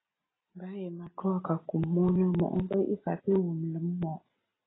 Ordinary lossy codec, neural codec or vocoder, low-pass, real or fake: AAC, 16 kbps; none; 7.2 kHz; real